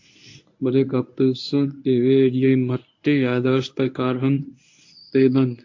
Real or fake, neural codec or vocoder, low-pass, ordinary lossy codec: fake; codec, 24 kHz, 0.9 kbps, WavTokenizer, medium speech release version 1; 7.2 kHz; AAC, 48 kbps